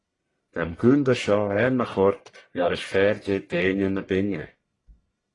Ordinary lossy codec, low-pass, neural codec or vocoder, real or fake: AAC, 32 kbps; 10.8 kHz; codec, 44.1 kHz, 1.7 kbps, Pupu-Codec; fake